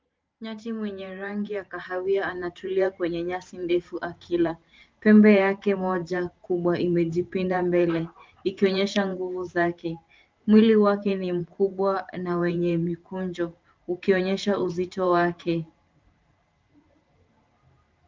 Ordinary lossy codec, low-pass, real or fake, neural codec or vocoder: Opus, 32 kbps; 7.2 kHz; fake; vocoder, 44.1 kHz, 128 mel bands every 512 samples, BigVGAN v2